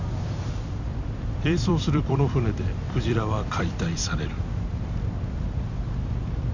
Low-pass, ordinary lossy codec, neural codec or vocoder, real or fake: 7.2 kHz; none; vocoder, 44.1 kHz, 128 mel bands every 256 samples, BigVGAN v2; fake